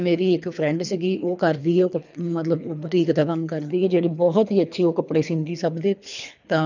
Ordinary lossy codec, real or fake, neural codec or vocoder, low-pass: none; fake; codec, 24 kHz, 3 kbps, HILCodec; 7.2 kHz